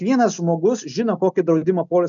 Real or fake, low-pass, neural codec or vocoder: real; 7.2 kHz; none